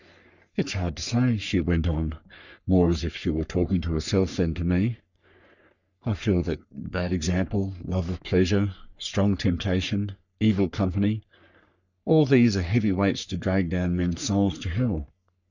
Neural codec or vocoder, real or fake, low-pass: codec, 44.1 kHz, 3.4 kbps, Pupu-Codec; fake; 7.2 kHz